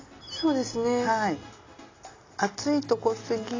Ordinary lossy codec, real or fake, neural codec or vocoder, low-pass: AAC, 32 kbps; real; none; 7.2 kHz